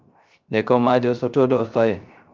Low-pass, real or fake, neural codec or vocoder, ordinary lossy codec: 7.2 kHz; fake; codec, 16 kHz, 0.3 kbps, FocalCodec; Opus, 24 kbps